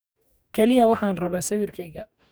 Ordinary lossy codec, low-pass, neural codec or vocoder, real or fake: none; none; codec, 44.1 kHz, 2.6 kbps, DAC; fake